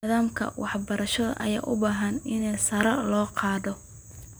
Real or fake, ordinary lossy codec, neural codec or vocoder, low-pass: real; none; none; none